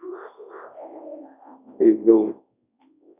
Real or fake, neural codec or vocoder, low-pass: fake; codec, 24 kHz, 0.9 kbps, WavTokenizer, large speech release; 3.6 kHz